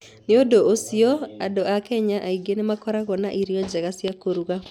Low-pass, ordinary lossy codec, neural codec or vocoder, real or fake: 19.8 kHz; none; none; real